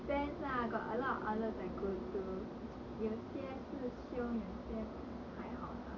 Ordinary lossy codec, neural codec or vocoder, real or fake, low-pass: none; none; real; 7.2 kHz